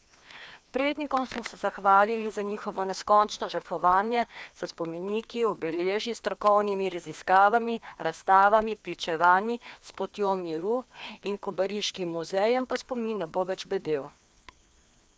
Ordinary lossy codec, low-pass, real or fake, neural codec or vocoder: none; none; fake; codec, 16 kHz, 2 kbps, FreqCodec, larger model